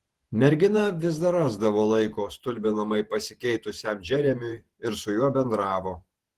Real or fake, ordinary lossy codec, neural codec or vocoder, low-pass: fake; Opus, 16 kbps; vocoder, 48 kHz, 128 mel bands, Vocos; 14.4 kHz